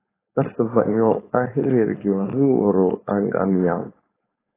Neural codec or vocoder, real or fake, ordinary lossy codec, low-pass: codec, 16 kHz, 4.8 kbps, FACodec; fake; AAC, 16 kbps; 3.6 kHz